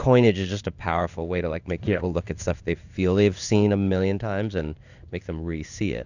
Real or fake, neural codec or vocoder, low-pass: fake; codec, 16 kHz in and 24 kHz out, 1 kbps, XY-Tokenizer; 7.2 kHz